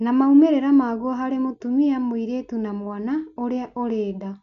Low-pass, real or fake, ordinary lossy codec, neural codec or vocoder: 7.2 kHz; real; none; none